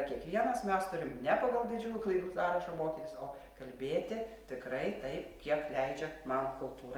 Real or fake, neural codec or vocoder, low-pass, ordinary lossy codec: real; none; 19.8 kHz; Opus, 32 kbps